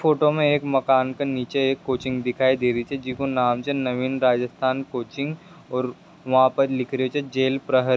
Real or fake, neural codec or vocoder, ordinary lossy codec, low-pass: real; none; none; none